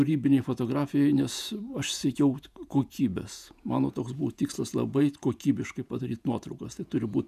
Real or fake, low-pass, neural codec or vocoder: fake; 14.4 kHz; vocoder, 48 kHz, 128 mel bands, Vocos